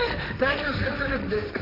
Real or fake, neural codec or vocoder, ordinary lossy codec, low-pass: fake; codec, 16 kHz, 1.1 kbps, Voila-Tokenizer; none; 5.4 kHz